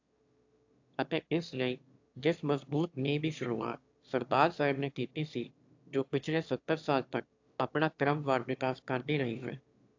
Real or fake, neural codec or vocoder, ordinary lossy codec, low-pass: fake; autoencoder, 22.05 kHz, a latent of 192 numbers a frame, VITS, trained on one speaker; AAC, 48 kbps; 7.2 kHz